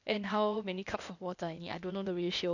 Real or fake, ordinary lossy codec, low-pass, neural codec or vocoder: fake; none; 7.2 kHz; codec, 16 kHz, 0.8 kbps, ZipCodec